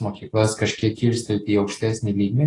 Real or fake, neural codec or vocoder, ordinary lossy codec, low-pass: real; none; AAC, 32 kbps; 10.8 kHz